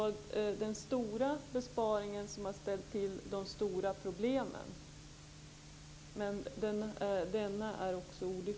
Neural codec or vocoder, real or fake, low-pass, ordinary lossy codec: none; real; none; none